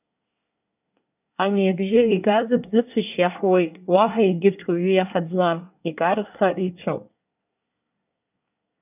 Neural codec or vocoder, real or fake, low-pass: codec, 24 kHz, 1 kbps, SNAC; fake; 3.6 kHz